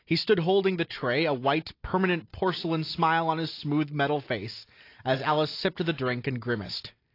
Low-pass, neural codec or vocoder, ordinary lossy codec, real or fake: 5.4 kHz; none; AAC, 32 kbps; real